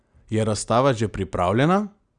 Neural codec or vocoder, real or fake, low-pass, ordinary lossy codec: none; real; 9.9 kHz; Opus, 64 kbps